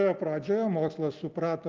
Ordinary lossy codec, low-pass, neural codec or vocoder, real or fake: Opus, 24 kbps; 7.2 kHz; none; real